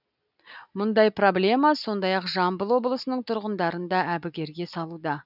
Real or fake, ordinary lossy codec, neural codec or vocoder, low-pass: real; none; none; 5.4 kHz